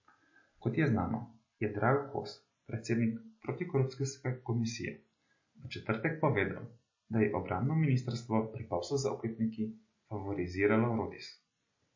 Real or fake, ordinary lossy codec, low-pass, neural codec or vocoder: real; MP3, 32 kbps; 7.2 kHz; none